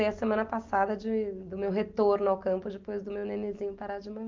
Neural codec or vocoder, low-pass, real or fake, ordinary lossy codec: none; 7.2 kHz; real; Opus, 32 kbps